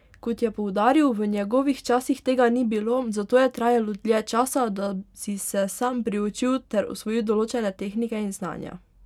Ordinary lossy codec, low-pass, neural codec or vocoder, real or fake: none; 19.8 kHz; none; real